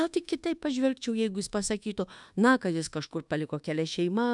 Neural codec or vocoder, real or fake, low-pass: codec, 24 kHz, 1.2 kbps, DualCodec; fake; 10.8 kHz